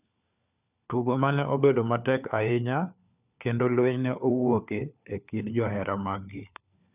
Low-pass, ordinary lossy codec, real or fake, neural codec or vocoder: 3.6 kHz; none; fake; codec, 16 kHz, 4 kbps, FunCodec, trained on LibriTTS, 50 frames a second